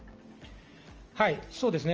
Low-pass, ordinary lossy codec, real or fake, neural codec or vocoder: 7.2 kHz; Opus, 24 kbps; real; none